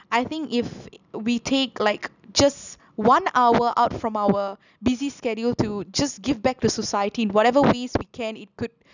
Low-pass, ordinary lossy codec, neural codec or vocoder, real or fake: 7.2 kHz; none; none; real